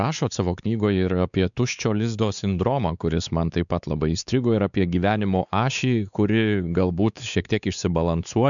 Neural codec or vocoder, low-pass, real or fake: codec, 16 kHz, 4 kbps, X-Codec, WavLM features, trained on Multilingual LibriSpeech; 7.2 kHz; fake